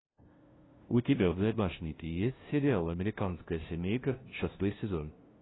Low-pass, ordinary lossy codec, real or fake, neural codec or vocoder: 7.2 kHz; AAC, 16 kbps; fake; codec, 16 kHz, 0.5 kbps, FunCodec, trained on LibriTTS, 25 frames a second